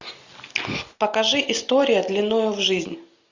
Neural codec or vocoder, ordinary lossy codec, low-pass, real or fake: none; Opus, 64 kbps; 7.2 kHz; real